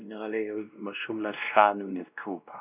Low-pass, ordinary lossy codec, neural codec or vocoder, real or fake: 3.6 kHz; none; codec, 16 kHz, 1 kbps, X-Codec, WavLM features, trained on Multilingual LibriSpeech; fake